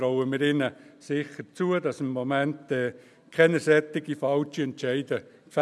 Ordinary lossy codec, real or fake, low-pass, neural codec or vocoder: none; real; none; none